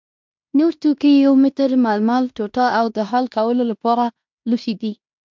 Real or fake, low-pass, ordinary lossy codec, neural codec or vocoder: fake; 7.2 kHz; MP3, 64 kbps; codec, 16 kHz in and 24 kHz out, 0.9 kbps, LongCat-Audio-Codec, fine tuned four codebook decoder